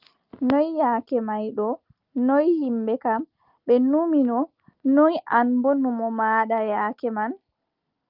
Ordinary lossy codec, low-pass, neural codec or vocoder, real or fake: Opus, 24 kbps; 5.4 kHz; none; real